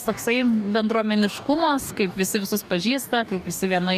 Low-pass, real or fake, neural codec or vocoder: 14.4 kHz; fake; codec, 44.1 kHz, 2.6 kbps, DAC